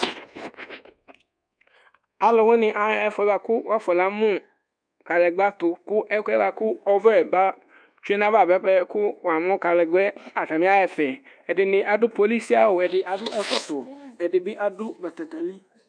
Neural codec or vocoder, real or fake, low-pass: codec, 24 kHz, 1.2 kbps, DualCodec; fake; 9.9 kHz